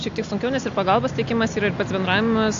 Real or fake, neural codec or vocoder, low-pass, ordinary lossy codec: real; none; 7.2 kHz; AAC, 64 kbps